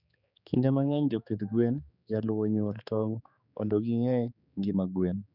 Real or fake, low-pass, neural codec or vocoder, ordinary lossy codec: fake; 5.4 kHz; codec, 16 kHz, 4 kbps, X-Codec, HuBERT features, trained on general audio; none